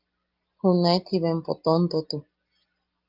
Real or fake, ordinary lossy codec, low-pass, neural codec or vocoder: real; Opus, 32 kbps; 5.4 kHz; none